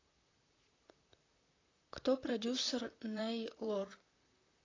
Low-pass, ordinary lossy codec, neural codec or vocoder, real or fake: 7.2 kHz; AAC, 32 kbps; vocoder, 44.1 kHz, 128 mel bands, Pupu-Vocoder; fake